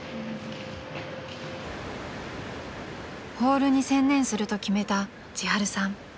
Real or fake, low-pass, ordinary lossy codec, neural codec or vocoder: real; none; none; none